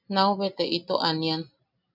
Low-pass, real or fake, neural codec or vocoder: 5.4 kHz; real; none